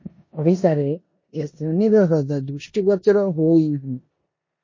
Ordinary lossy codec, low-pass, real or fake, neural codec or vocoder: MP3, 32 kbps; 7.2 kHz; fake; codec, 16 kHz in and 24 kHz out, 0.9 kbps, LongCat-Audio-Codec, four codebook decoder